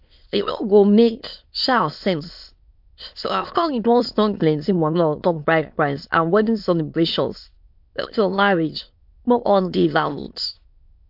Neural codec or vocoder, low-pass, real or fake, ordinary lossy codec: autoencoder, 22.05 kHz, a latent of 192 numbers a frame, VITS, trained on many speakers; 5.4 kHz; fake; MP3, 48 kbps